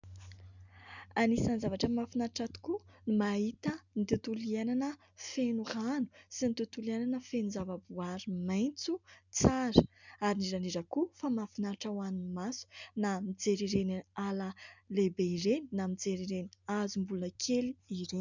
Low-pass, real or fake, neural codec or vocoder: 7.2 kHz; real; none